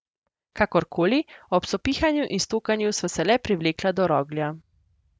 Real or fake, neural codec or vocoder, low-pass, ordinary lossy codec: real; none; none; none